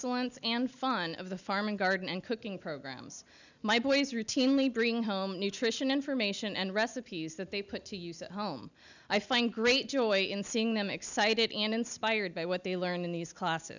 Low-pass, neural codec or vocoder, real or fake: 7.2 kHz; none; real